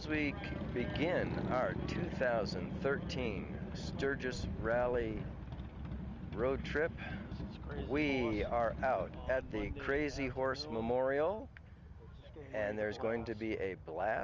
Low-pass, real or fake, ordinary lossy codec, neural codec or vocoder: 7.2 kHz; real; Opus, 32 kbps; none